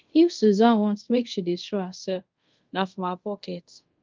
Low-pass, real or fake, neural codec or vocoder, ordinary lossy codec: 7.2 kHz; fake; codec, 24 kHz, 0.5 kbps, DualCodec; Opus, 32 kbps